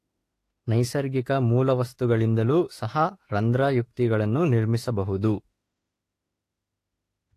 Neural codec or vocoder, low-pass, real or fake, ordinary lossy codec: autoencoder, 48 kHz, 32 numbers a frame, DAC-VAE, trained on Japanese speech; 14.4 kHz; fake; AAC, 48 kbps